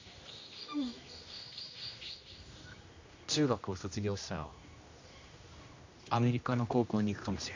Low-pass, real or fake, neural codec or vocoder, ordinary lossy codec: 7.2 kHz; fake; codec, 16 kHz, 1 kbps, X-Codec, HuBERT features, trained on general audio; AAC, 48 kbps